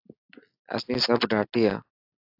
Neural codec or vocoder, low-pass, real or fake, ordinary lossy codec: none; 5.4 kHz; real; AAC, 48 kbps